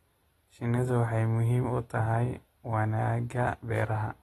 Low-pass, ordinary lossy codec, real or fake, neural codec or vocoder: 19.8 kHz; AAC, 32 kbps; real; none